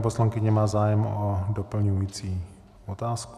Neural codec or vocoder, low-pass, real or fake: none; 14.4 kHz; real